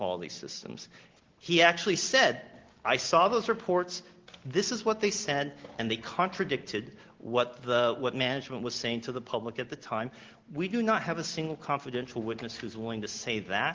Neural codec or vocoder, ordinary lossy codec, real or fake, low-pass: none; Opus, 16 kbps; real; 7.2 kHz